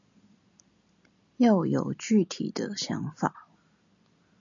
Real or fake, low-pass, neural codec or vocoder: real; 7.2 kHz; none